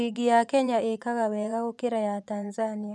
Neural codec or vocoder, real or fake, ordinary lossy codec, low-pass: vocoder, 24 kHz, 100 mel bands, Vocos; fake; none; none